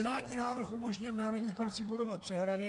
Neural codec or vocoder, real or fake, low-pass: codec, 24 kHz, 1 kbps, SNAC; fake; 10.8 kHz